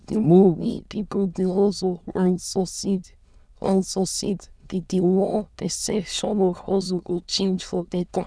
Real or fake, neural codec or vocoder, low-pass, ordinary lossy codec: fake; autoencoder, 22.05 kHz, a latent of 192 numbers a frame, VITS, trained on many speakers; none; none